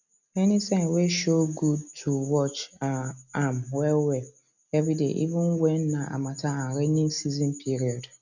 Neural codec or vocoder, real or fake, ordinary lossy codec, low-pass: none; real; none; 7.2 kHz